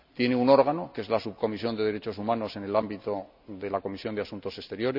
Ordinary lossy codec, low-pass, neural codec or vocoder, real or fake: none; 5.4 kHz; none; real